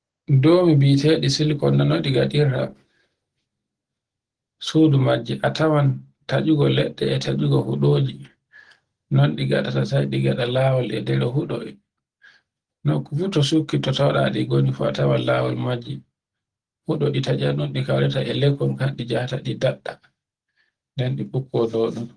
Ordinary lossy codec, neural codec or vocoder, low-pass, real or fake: Opus, 16 kbps; none; 9.9 kHz; real